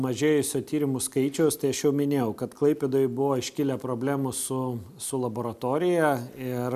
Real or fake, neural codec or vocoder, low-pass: real; none; 14.4 kHz